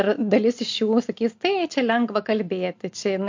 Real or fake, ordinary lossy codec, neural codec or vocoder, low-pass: real; MP3, 48 kbps; none; 7.2 kHz